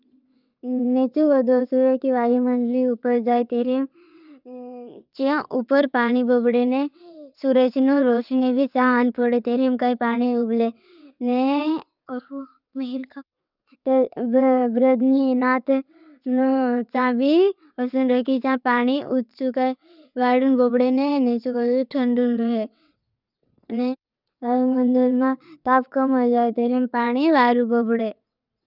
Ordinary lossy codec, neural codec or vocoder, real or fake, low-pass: none; vocoder, 22.05 kHz, 80 mel bands, WaveNeXt; fake; 5.4 kHz